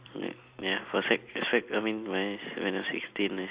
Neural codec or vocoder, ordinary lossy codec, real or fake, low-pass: none; Opus, 64 kbps; real; 3.6 kHz